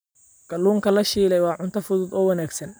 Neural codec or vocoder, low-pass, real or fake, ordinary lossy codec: none; none; real; none